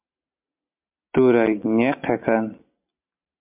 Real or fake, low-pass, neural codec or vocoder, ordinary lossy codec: real; 3.6 kHz; none; MP3, 32 kbps